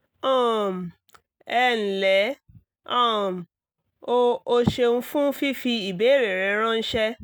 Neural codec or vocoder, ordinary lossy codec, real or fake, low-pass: none; none; real; none